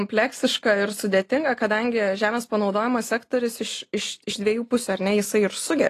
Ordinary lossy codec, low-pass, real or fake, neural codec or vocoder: AAC, 48 kbps; 14.4 kHz; real; none